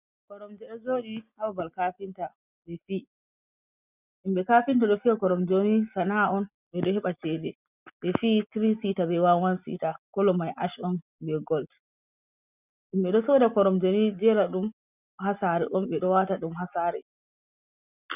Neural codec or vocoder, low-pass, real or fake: none; 3.6 kHz; real